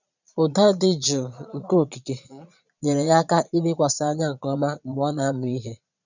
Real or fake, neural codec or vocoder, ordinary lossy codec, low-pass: fake; vocoder, 22.05 kHz, 80 mel bands, Vocos; none; 7.2 kHz